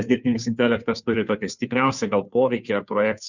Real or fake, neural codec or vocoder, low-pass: fake; codec, 16 kHz, 2 kbps, FunCodec, trained on Chinese and English, 25 frames a second; 7.2 kHz